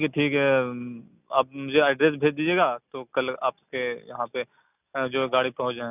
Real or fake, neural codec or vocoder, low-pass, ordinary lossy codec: real; none; 3.6 kHz; none